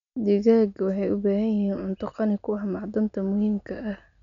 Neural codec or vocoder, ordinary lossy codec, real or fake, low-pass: none; none; real; 7.2 kHz